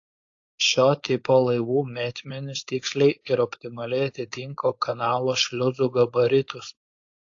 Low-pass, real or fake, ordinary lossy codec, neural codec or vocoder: 7.2 kHz; fake; AAC, 48 kbps; codec, 16 kHz, 4.8 kbps, FACodec